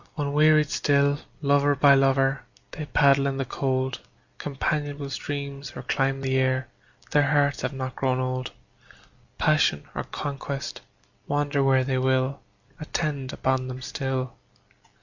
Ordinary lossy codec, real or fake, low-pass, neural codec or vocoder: Opus, 64 kbps; real; 7.2 kHz; none